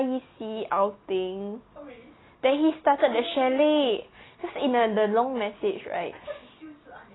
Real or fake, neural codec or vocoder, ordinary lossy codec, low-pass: real; none; AAC, 16 kbps; 7.2 kHz